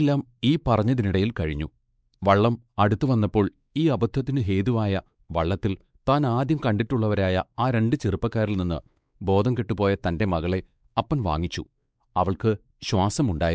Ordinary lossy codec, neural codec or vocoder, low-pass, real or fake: none; codec, 16 kHz, 4 kbps, X-Codec, WavLM features, trained on Multilingual LibriSpeech; none; fake